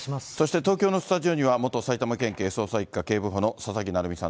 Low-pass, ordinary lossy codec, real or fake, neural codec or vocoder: none; none; real; none